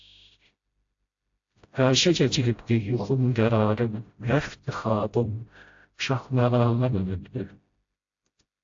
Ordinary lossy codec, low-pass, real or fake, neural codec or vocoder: AAC, 48 kbps; 7.2 kHz; fake; codec, 16 kHz, 0.5 kbps, FreqCodec, smaller model